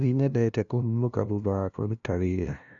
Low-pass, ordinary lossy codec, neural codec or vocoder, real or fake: 7.2 kHz; none; codec, 16 kHz, 0.5 kbps, FunCodec, trained on LibriTTS, 25 frames a second; fake